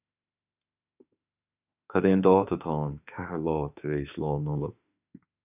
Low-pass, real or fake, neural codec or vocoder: 3.6 kHz; fake; autoencoder, 48 kHz, 32 numbers a frame, DAC-VAE, trained on Japanese speech